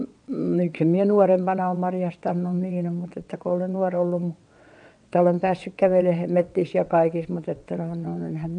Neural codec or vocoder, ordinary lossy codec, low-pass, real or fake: vocoder, 22.05 kHz, 80 mel bands, WaveNeXt; AAC, 64 kbps; 9.9 kHz; fake